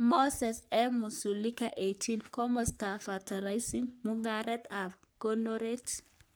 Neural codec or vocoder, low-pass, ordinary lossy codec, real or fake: codec, 44.1 kHz, 3.4 kbps, Pupu-Codec; none; none; fake